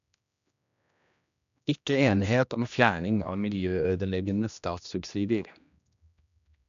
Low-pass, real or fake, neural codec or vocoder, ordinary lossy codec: 7.2 kHz; fake; codec, 16 kHz, 1 kbps, X-Codec, HuBERT features, trained on general audio; none